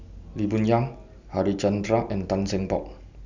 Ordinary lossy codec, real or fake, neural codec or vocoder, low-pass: none; real; none; 7.2 kHz